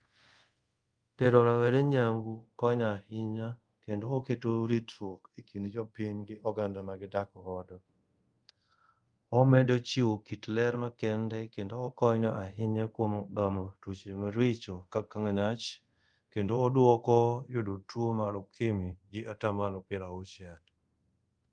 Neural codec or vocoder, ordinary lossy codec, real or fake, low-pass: codec, 24 kHz, 0.5 kbps, DualCodec; Opus, 32 kbps; fake; 9.9 kHz